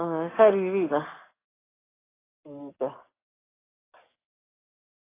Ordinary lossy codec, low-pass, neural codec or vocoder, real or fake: AAC, 16 kbps; 3.6 kHz; codec, 44.1 kHz, 7.8 kbps, DAC; fake